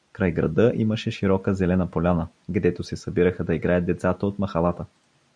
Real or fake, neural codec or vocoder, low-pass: real; none; 9.9 kHz